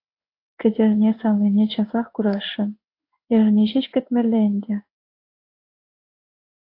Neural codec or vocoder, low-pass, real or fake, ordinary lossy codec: codec, 16 kHz in and 24 kHz out, 1 kbps, XY-Tokenizer; 5.4 kHz; fake; AAC, 32 kbps